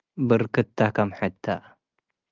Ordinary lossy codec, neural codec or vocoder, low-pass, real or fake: Opus, 24 kbps; none; 7.2 kHz; real